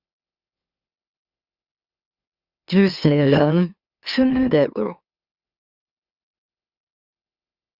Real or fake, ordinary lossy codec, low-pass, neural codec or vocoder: fake; Opus, 64 kbps; 5.4 kHz; autoencoder, 44.1 kHz, a latent of 192 numbers a frame, MeloTTS